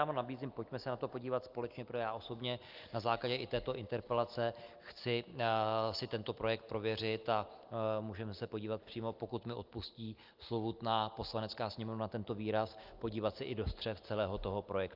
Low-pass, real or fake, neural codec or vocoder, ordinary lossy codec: 5.4 kHz; real; none; Opus, 32 kbps